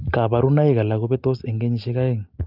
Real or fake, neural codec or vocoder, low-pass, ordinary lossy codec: real; none; 5.4 kHz; Opus, 32 kbps